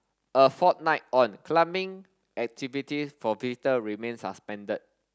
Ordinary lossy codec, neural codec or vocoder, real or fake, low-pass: none; none; real; none